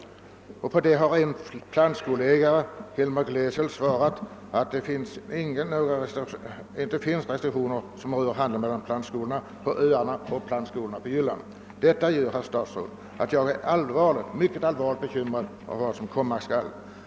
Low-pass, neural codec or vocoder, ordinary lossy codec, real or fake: none; none; none; real